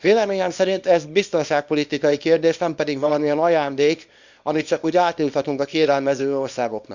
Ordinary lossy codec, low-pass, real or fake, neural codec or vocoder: Opus, 64 kbps; 7.2 kHz; fake; codec, 24 kHz, 0.9 kbps, WavTokenizer, small release